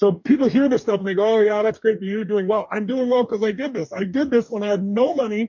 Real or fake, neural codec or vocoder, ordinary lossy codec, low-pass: fake; codec, 44.1 kHz, 2.6 kbps, DAC; MP3, 48 kbps; 7.2 kHz